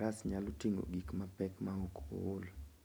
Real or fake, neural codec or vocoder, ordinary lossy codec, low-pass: real; none; none; none